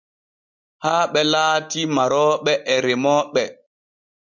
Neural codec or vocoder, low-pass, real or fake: none; 7.2 kHz; real